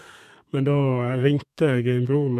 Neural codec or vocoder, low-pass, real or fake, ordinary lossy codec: codec, 32 kHz, 1.9 kbps, SNAC; 14.4 kHz; fake; MP3, 96 kbps